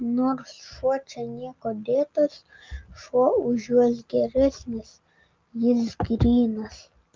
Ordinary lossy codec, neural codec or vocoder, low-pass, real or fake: Opus, 32 kbps; none; 7.2 kHz; real